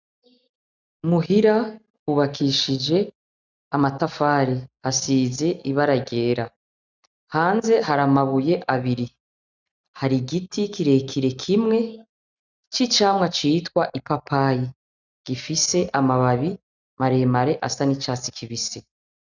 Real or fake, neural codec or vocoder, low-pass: real; none; 7.2 kHz